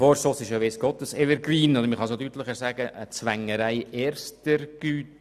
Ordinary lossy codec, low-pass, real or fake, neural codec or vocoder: none; 14.4 kHz; real; none